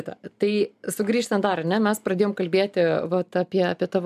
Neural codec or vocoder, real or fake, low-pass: none; real; 14.4 kHz